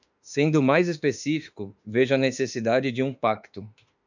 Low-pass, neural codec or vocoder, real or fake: 7.2 kHz; autoencoder, 48 kHz, 32 numbers a frame, DAC-VAE, trained on Japanese speech; fake